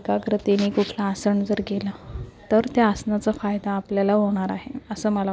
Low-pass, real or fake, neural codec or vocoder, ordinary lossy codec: none; real; none; none